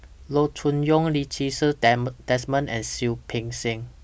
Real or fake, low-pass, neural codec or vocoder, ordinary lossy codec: real; none; none; none